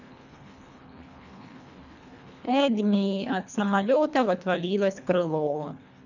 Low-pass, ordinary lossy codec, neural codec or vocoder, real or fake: 7.2 kHz; none; codec, 24 kHz, 1.5 kbps, HILCodec; fake